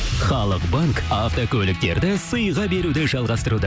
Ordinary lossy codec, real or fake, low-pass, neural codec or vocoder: none; real; none; none